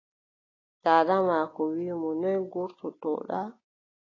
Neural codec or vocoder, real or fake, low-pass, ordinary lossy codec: none; real; 7.2 kHz; AAC, 32 kbps